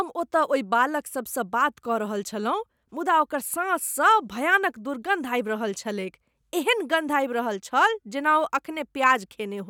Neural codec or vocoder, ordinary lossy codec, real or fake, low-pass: none; none; real; 19.8 kHz